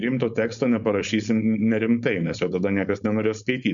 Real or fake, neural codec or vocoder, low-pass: fake; codec, 16 kHz, 4.8 kbps, FACodec; 7.2 kHz